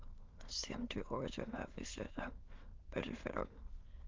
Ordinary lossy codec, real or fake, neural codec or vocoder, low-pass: Opus, 32 kbps; fake; autoencoder, 22.05 kHz, a latent of 192 numbers a frame, VITS, trained on many speakers; 7.2 kHz